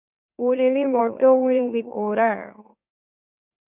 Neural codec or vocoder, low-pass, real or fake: autoencoder, 44.1 kHz, a latent of 192 numbers a frame, MeloTTS; 3.6 kHz; fake